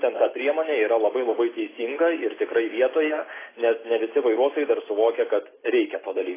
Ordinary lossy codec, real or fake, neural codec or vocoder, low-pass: AAC, 16 kbps; real; none; 3.6 kHz